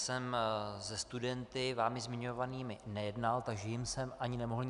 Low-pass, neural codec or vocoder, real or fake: 10.8 kHz; none; real